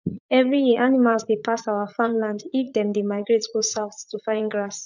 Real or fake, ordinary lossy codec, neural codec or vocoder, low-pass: fake; none; vocoder, 44.1 kHz, 80 mel bands, Vocos; 7.2 kHz